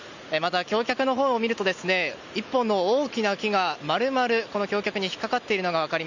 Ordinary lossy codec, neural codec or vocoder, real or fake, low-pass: none; none; real; 7.2 kHz